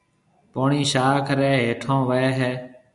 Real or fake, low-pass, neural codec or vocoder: real; 10.8 kHz; none